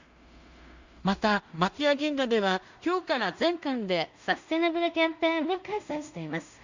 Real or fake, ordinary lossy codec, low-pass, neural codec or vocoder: fake; Opus, 64 kbps; 7.2 kHz; codec, 16 kHz in and 24 kHz out, 0.4 kbps, LongCat-Audio-Codec, two codebook decoder